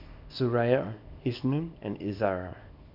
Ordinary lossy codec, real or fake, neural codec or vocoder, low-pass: none; fake; codec, 24 kHz, 0.9 kbps, WavTokenizer, small release; 5.4 kHz